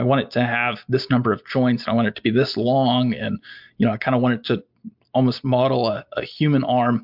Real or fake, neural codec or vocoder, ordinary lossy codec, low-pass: real; none; MP3, 48 kbps; 5.4 kHz